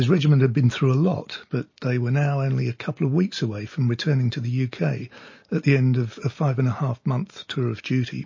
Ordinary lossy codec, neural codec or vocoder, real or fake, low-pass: MP3, 32 kbps; none; real; 7.2 kHz